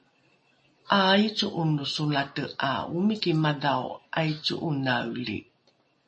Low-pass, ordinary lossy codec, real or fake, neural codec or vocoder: 10.8 kHz; MP3, 32 kbps; real; none